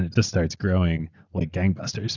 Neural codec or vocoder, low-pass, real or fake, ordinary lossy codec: none; 7.2 kHz; real; Opus, 64 kbps